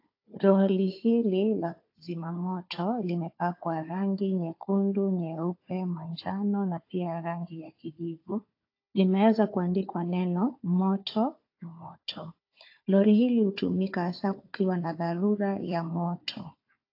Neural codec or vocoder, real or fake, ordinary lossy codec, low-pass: codec, 16 kHz, 4 kbps, FunCodec, trained on Chinese and English, 50 frames a second; fake; AAC, 32 kbps; 5.4 kHz